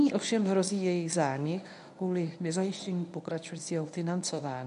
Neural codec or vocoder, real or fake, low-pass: codec, 24 kHz, 0.9 kbps, WavTokenizer, medium speech release version 1; fake; 10.8 kHz